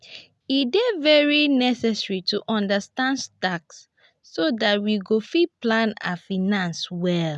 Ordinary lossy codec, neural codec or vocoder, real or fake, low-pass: none; none; real; none